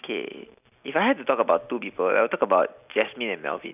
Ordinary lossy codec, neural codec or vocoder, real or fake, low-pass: none; none; real; 3.6 kHz